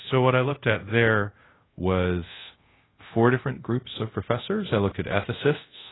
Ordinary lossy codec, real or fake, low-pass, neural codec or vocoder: AAC, 16 kbps; fake; 7.2 kHz; codec, 24 kHz, 0.5 kbps, DualCodec